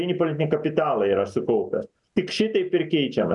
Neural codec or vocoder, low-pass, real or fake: none; 10.8 kHz; real